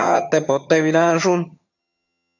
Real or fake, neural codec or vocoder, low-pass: fake; vocoder, 22.05 kHz, 80 mel bands, HiFi-GAN; 7.2 kHz